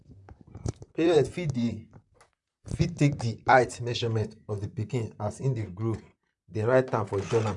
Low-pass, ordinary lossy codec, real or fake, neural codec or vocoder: 10.8 kHz; none; fake; vocoder, 44.1 kHz, 128 mel bands, Pupu-Vocoder